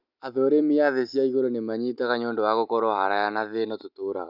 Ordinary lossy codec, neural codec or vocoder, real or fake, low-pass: none; none; real; 5.4 kHz